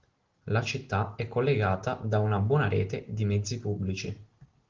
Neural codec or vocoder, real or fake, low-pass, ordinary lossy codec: none; real; 7.2 kHz; Opus, 16 kbps